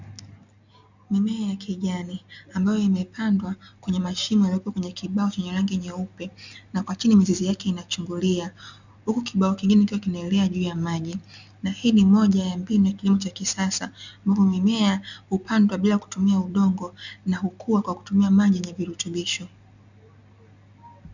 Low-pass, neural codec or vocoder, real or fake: 7.2 kHz; none; real